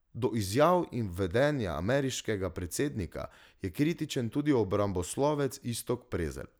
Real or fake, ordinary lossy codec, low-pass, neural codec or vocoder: real; none; none; none